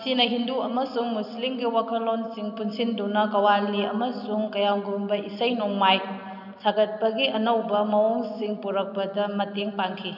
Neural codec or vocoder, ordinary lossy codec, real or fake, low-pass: none; none; real; 5.4 kHz